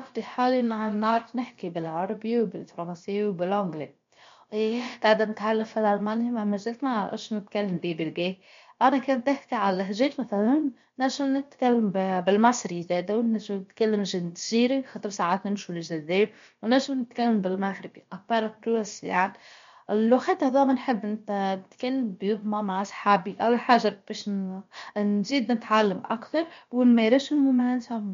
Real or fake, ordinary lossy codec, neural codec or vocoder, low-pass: fake; MP3, 48 kbps; codec, 16 kHz, about 1 kbps, DyCAST, with the encoder's durations; 7.2 kHz